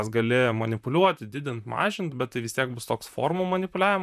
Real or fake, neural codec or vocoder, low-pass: fake; vocoder, 44.1 kHz, 128 mel bands, Pupu-Vocoder; 14.4 kHz